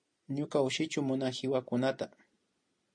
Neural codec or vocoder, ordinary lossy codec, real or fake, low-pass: none; MP3, 48 kbps; real; 9.9 kHz